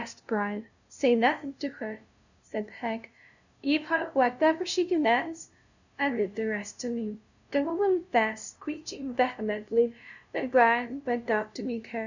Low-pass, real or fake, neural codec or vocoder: 7.2 kHz; fake; codec, 16 kHz, 0.5 kbps, FunCodec, trained on LibriTTS, 25 frames a second